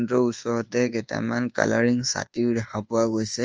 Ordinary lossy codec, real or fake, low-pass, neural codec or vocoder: Opus, 24 kbps; fake; 7.2 kHz; codec, 24 kHz, 1.2 kbps, DualCodec